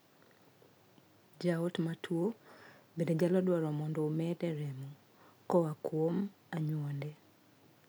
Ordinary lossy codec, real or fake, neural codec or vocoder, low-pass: none; real; none; none